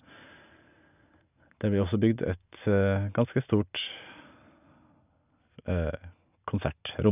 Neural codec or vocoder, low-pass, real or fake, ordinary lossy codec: none; 3.6 kHz; real; none